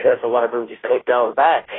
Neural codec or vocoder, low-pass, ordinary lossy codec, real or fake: codec, 16 kHz, 0.5 kbps, FunCodec, trained on Chinese and English, 25 frames a second; 7.2 kHz; AAC, 16 kbps; fake